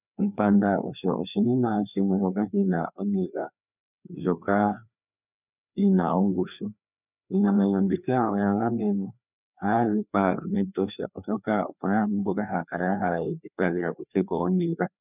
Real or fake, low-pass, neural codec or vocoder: fake; 3.6 kHz; codec, 16 kHz, 2 kbps, FreqCodec, larger model